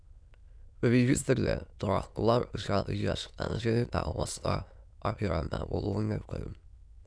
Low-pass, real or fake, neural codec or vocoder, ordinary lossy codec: 9.9 kHz; fake; autoencoder, 22.05 kHz, a latent of 192 numbers a frame, VITS, trained on many speakers; none